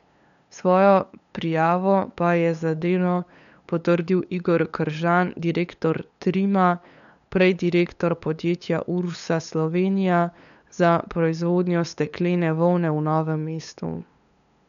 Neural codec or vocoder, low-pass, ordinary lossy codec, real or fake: codec, 16 kHz, 8 kbps, FunCodec, trained on LibriTTS, 25 frames a second; 7.2 kHz; none; fake